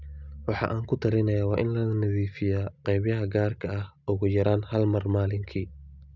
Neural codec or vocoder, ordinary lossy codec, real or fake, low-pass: none; none; real; 7.2 kHz